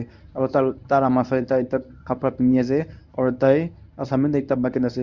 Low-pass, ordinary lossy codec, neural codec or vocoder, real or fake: 7.2 kHz; none; codec, 24 kHz, 0.9 kbps, WavTokenizer, medium speech release version 1; fake